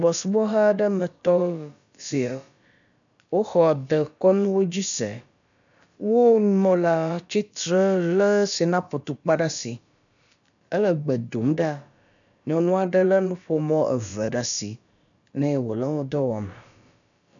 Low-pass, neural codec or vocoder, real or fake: 7.2 kHz; codec, 16 kHz, about 1 kbps, DyCAST, with the encoder's durations; fake